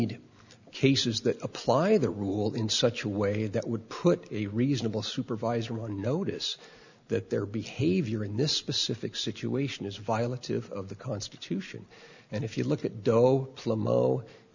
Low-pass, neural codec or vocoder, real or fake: 7.2 kHz; none; real